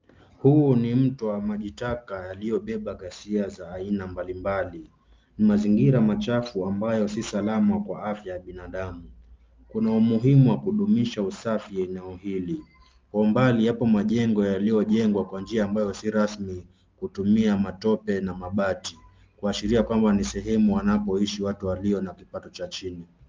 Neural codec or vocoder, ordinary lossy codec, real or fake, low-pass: none; Opus, 24 kbps; real; 7.2 kHz